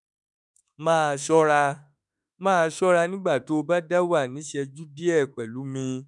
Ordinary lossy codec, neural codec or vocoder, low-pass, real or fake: none; autoencoder, 48 kHz, 32 numbers a frame, DAC-VAE, trained on Japanese speech; 10.8 kHz; fake